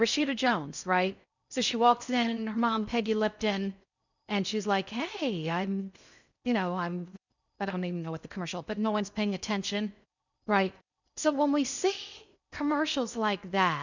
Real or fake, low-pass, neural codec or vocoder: fake; 7.2 kHz; codec, 16 kHz in and 24 kHz out, 0.6 kbps, FocalCodec, streaming, 4096 codes